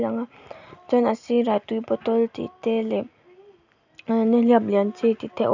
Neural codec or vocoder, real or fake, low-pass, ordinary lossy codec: none; real; 7.2 kHz; none